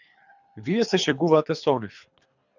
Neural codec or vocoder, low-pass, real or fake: codec, 24 kHz, 3 kbps, HILCodec; 7.2 kHz; fake